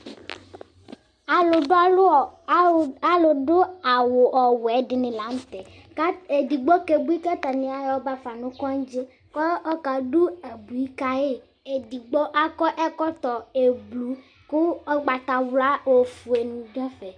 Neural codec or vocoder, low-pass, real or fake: none; 9.9 kHz; real